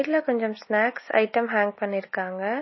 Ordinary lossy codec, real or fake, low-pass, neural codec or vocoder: MP3, 24 kbps; real; 7.2 kHz; none